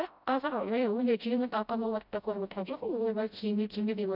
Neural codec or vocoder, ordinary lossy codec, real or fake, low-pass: codec, 16 kHz, 0.5 kbps, FreqCodec, smaller model; none; fake; 5.4 kHz